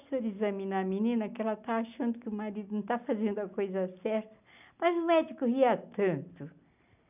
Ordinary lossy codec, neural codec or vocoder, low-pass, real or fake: none; none; 3.6 kHz; real